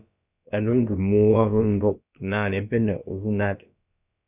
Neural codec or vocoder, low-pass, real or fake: codec, 16 kHz, about 1 kbps, DyCAST, with the encoder's durations; 3.6 kHz; fake